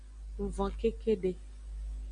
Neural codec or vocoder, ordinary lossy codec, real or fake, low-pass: none; AAC, 64 kbps; real; 9.9 kHz